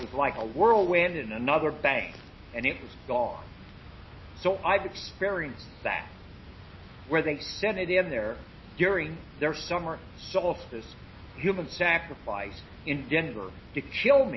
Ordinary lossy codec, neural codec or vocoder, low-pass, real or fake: MP3, 24 kbps; none; 7.2 kHz; real